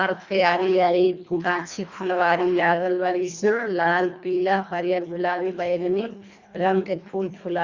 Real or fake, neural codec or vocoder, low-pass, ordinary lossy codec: fake; codec, 24 kHz, 1.5 kbps, HILCodec; 7.2 kHz; Opus, 64 kbps